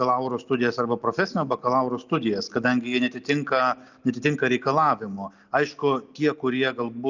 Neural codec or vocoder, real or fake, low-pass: none; real; 7.2 kHz